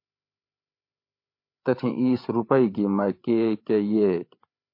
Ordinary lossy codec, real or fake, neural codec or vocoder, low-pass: MP3, 32 kbps; fake; codec, 16 kHz, 8 kbps, FreqCodec, larger model; 5.4 kHz